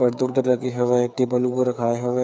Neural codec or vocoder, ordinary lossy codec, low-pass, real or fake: codec, 16 kHz, 8 kbps, FreqCodec, smaller model; none; none; fake